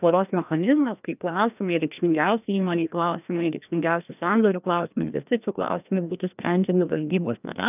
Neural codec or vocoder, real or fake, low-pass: codec, 16 kHz, 1 kbps, FreqCodec, larger model; fake; 3.6 kHz